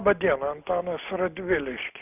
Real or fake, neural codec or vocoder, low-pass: real; none; 3.6 kHz